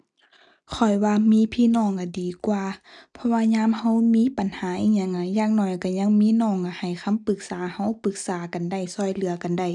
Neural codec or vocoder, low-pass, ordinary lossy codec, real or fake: none; 10.8 kHz; none; real